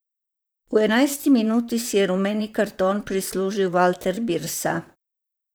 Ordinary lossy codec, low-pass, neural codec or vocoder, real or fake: none; none; vocoder, 44.1 kHz, 128 mel bands, Pupu-Vocoder; fake